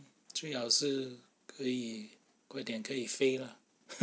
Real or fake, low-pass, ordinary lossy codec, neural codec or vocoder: real; none; none; none